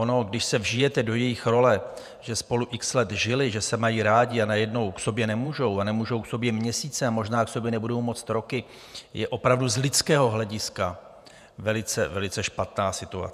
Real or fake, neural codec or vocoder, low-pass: fake; vocoder, 48 kHz, 128 mel bands, Vocos; 14.4 kHz